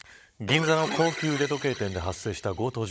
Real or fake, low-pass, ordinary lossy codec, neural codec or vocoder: fake; none; none; codec, 16 kHz, 16 kbps, FunCodec, trained on Chinese and English, 50 frames a second